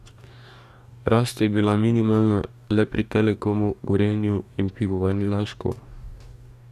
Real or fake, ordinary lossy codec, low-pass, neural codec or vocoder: fake; none; 14.4 kHz; codec, 44.1 kHz, 2.6 kbps, DAC